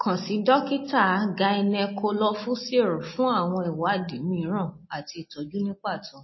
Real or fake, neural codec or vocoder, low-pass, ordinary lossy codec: real; none; 7.2 kHz; MP3, 24 kbps